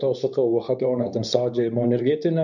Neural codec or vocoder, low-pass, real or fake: codec, 24 kHz, 0.9 kbps, WavTokenizer, medium speech release version 2; 7.2 kHz; fake